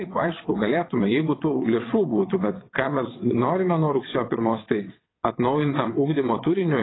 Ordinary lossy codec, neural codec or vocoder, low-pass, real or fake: AAC, 16 kbps; vocoder, 22.05 kHz, 80 mel bands, WaveNeXt; 7.2 kHz; fake